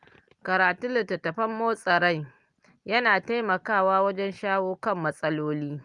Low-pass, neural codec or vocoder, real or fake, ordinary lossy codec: 10.8 kHz; none; real; Opus, 32 kbps